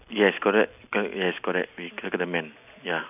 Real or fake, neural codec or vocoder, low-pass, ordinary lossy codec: real; none; 3.6 kHz; none